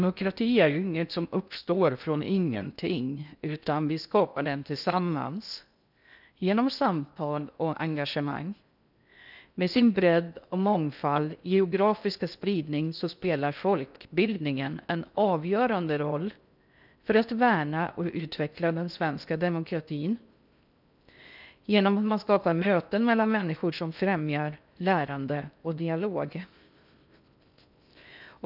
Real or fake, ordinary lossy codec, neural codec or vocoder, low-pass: fake; none; codec, 16 kHz in and 24 kHz out, 0.6 kbps, FocalCodec, streaming, 2048 codes; 5.4 kHz